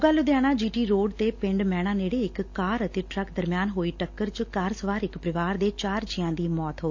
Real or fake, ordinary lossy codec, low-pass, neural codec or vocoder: real; AAC, 48 kbps; 7.2 kHz; none